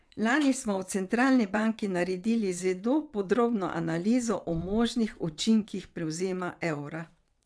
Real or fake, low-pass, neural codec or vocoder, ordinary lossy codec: fake; none; vocoder, 22.05 kHz, 80 mel bands, WaveNeXt; none